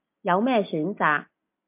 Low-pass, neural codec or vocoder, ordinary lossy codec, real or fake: 3.6 kHz; none; MP3, 32 kbps; real